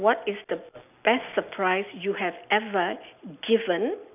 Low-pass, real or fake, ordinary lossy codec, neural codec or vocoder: 3.6 kHz; real; none; none